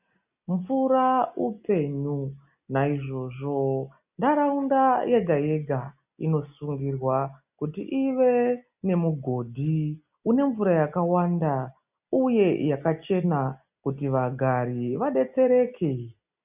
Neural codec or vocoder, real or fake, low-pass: none; real; 3.6 kHz